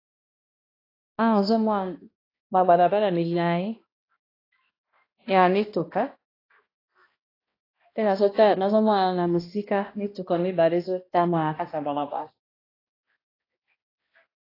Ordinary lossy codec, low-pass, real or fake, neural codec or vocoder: AAC, 24 kbps; 5.4 kHz; fake; codec, 16 kHz, 1 kbps, X-Codec, HuBERT features, trained on balanced general audio